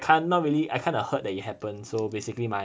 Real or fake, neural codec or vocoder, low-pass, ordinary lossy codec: real; none; none; none